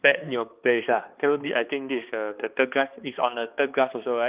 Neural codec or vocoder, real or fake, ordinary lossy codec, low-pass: codec, 16 kHz, 2 kbps, X-Codec, HuBERT features, trained on balanced general audio; fake; Opus, 32 kbps; 3.6 kHz